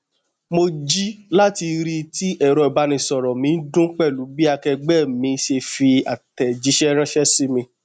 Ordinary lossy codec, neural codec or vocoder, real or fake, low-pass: none; none; real; 9.9 kHz